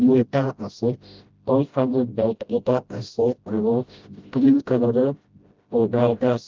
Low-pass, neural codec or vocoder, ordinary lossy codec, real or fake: 7.2 kHz; codec, 16 kHz, 0.5 kbps, FreqCodec, smaller model; Opus, 24 kbps; fake